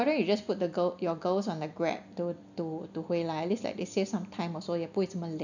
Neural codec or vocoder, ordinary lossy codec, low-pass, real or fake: none; none; 7.2 kHz; real